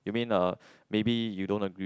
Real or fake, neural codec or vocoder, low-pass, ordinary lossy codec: real; none; none; none